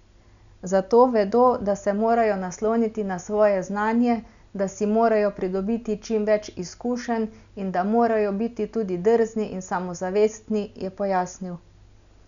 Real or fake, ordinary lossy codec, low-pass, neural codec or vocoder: real; none; 7.2 kHz; none